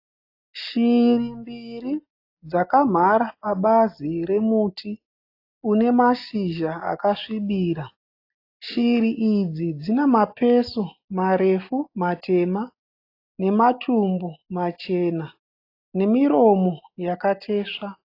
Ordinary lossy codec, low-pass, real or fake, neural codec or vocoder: AAC, 32 kbps; 5.4 kHz; real; none